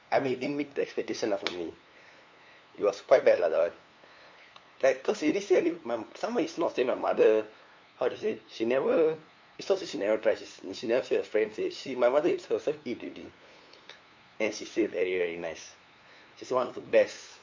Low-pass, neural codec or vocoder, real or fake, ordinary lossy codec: 7.2 kHz; codec, 16 kHz, 2 kbps, FunCodec, trained on LibriTTS, 25 frames a second; fake; MP3, 48 kbps